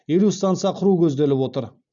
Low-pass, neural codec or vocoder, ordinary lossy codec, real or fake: 7.2 kHz; none; none; real